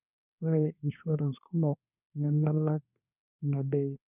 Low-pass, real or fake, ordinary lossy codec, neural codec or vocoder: 3.6 kHz; fake; none; codec, 24 kHz, 1 kbps, SNAC